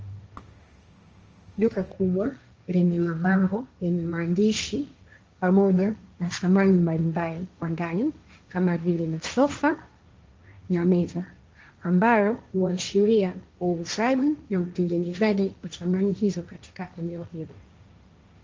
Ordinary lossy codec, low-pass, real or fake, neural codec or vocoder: Opus, 24 kbps; 7.2 kHz; fake; codec, 16 kHz, 1.1 kbps, Voila-Tokenizer